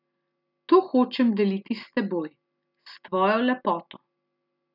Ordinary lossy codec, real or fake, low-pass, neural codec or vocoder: none; real; 5.4 kHz; none